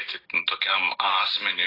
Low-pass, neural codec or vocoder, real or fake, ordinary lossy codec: 5.4 kHz; none; real; AAC, 24 kbps